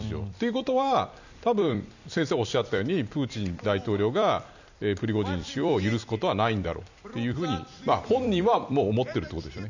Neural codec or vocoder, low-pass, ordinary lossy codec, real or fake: vocoder, 44.1 kHz, 128 mel bands every 256 samples, BigVGAN v2; 7.2 kHz; none; fake